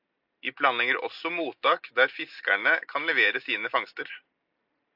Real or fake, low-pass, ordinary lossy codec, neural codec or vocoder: real; 5.4 kHz; AAC, 48 kbps; none